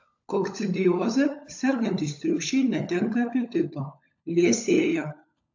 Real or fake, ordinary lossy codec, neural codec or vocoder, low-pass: fake; AAC, 48 kbps; codec, 16 kHz, 16 kbps, FunCodec, trained on LibriTTS, 50 frames a second; 7.2 kHz